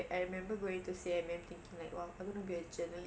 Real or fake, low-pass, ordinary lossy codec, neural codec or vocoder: real; none; none; none